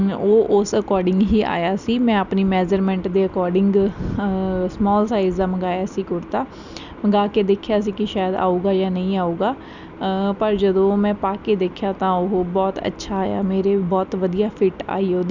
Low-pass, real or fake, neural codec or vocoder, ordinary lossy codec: 7.2 kHz; real; none; none